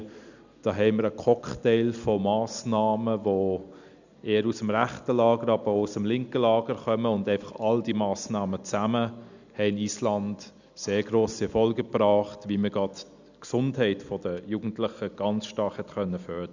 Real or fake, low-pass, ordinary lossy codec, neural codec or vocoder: real; 7.2 kHz; none; none